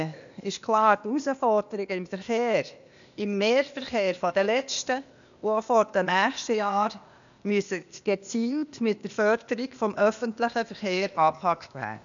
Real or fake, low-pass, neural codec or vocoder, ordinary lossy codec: fake; 7.2 kHz; codec, 16 kHz, 0.8 kbps, ZipCodec; none